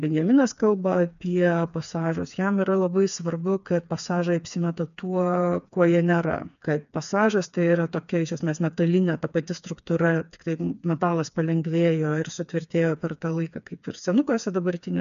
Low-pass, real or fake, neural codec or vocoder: 7.2 kHz; fake; codec, 16 kHz, 4 kbps, FreqCodec, smaller model